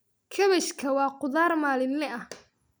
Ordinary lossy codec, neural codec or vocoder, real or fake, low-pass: none; none; real; none